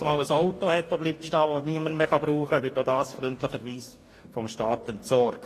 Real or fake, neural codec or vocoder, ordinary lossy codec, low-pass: fake; codec, 44.1 kHz, 2.6 kbps, DAC; AAC, 48 kbps; 14.4 kHz